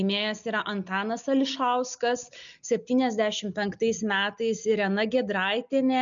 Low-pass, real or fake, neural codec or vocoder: 7.2 kHz; real; none